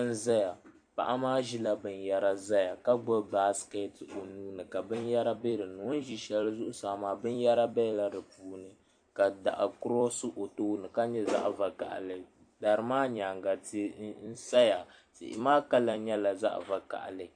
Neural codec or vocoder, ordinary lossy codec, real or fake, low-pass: vocoder, 44.1 kHz, 128 mel bands every 256 samples, BigVGAN v2; AAC, 64 kbps; fake; 9.9 kHz